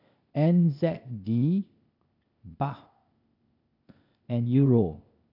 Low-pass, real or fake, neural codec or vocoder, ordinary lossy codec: 5.4 kHz; fake; codec, 16 kHz, 0.8 kbps, ZipCodec; none